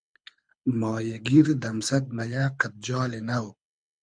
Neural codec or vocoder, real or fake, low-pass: codec, 24 kHz, 6 kbps, HILCodec; fake; 9.9 kHz